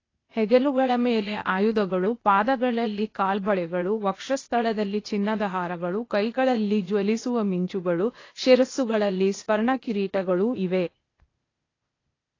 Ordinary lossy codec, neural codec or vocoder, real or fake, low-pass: AAC, 32 kbps; codec, 16 kHz, 0.8 kbps, ZipCodec; fake; 7.2 kHz